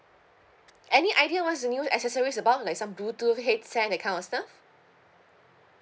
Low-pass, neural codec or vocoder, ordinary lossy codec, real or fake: none; none; none; real